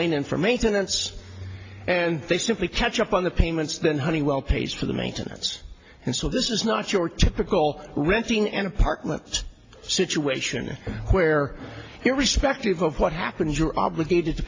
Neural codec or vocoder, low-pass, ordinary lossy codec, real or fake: none; 7.2 kHz; AAC, 32 kbps; real